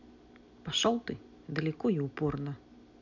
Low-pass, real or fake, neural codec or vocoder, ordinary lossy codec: 7.2 kHz; real; none; none